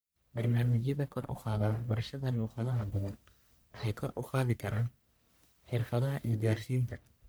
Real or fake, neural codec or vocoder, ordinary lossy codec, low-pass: fake; codec, 44.1 kHz, 1.7 kbps, Pupu-Codec; none; none